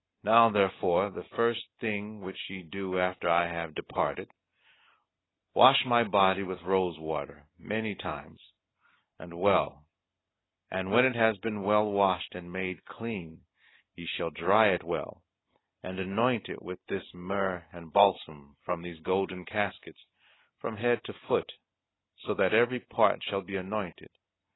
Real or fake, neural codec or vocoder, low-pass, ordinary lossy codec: real; none; 7.2 kHz; AAC, 16 kbps